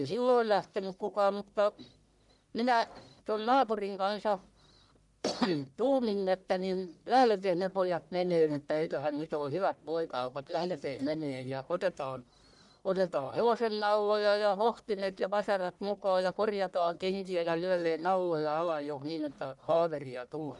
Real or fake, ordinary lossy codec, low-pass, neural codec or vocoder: fake; none; 10.8 kHz; codec, 44.1 kHz, 1.7 kbps, Pupu-Codec